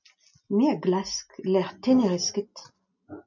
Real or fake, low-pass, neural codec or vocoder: real; 7.2 kHz; none